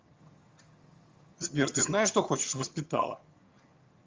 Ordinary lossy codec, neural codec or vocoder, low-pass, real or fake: Opus, 32 kbps; vocoder, 22.05 kHz, 80 mel bands, HiFi-GAN; 7.2 kHz; fake